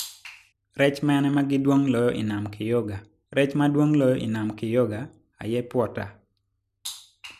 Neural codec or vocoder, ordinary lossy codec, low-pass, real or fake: none; none; 14.4 kHz; real